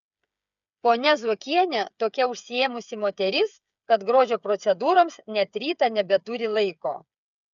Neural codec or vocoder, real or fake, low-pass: codec, 16 kHz, 8 kbps, FreqCodec, smaller model; fake; 7.2 kHz